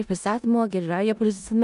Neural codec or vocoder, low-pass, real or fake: codec, 16 kHz in and 24 kHz out, 0.4 kbps, LongCat-Audio-Codec, four codebook decoder; 10.8 kHz; fake